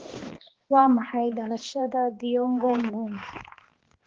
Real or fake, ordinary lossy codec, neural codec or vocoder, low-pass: fake; Opus, 16 kbps; codec, 16 kHz, 2 kbps, X-Codec, HuBERT features, trained on balanced general audio; 7.2 kHz